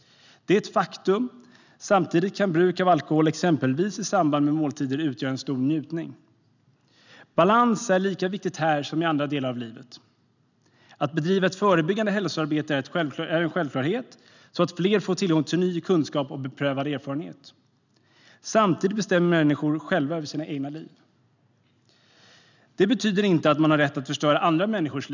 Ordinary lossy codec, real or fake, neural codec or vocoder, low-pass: none; real; none; 7.2 kHz